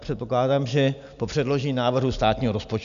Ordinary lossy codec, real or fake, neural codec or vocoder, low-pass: MP3, 96 kbps; real; none; 7.2 kHz